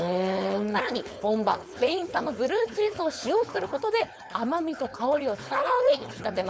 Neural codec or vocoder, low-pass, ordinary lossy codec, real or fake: codec, 16 kHz, 4.8 kbps, FACodec; none; none; fake